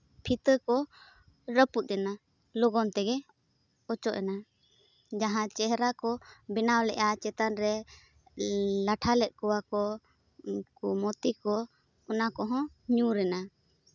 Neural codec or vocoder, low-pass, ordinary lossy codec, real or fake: none; 7.2 kHz; none; real